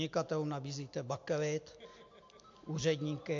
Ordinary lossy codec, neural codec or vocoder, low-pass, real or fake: AAC, 48 kbps; none; 7.2 kHz; real